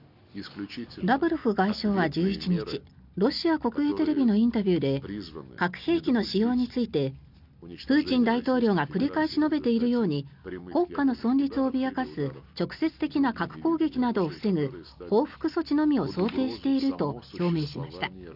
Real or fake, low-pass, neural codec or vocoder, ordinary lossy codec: real; 5.4 kHz; none; none